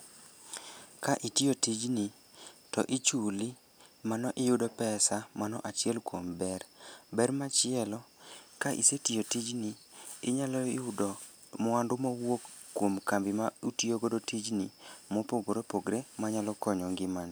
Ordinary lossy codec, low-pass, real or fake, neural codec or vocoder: none; none; real; none